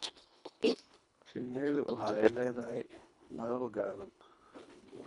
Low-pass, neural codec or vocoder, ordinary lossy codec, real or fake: 10.8 kHz; codec, 24 kHz, 1.5 kbps, HILCodec; none; fake